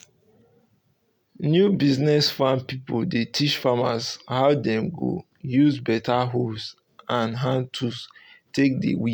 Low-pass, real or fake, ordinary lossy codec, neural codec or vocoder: none; real; none; none